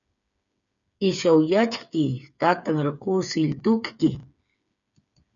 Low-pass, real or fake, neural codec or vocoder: 7.2 kHz; fake; codec, 16 kHz, 8 kbps, FreqCodec, smaller model